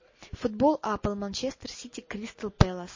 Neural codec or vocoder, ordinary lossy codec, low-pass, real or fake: none; MP3, 32 kbps; 7.2 kHz; real